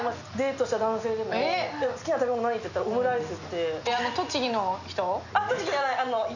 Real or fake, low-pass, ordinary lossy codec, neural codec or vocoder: real; 7.2 kHz; none; none